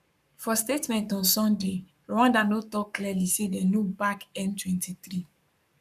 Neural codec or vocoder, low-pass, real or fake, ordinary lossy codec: codec, 44.1 kHz, 7.8 kbps, Pupu-Codec; 14.4 kHz; fake; none